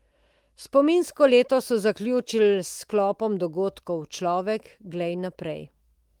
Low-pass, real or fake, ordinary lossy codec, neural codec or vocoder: 19.8 kHz; fake; Opus, 24 kbps; autoencoder, 48 kHz, 128 numbers a frame, DAC-VAE, trained on Japanese speech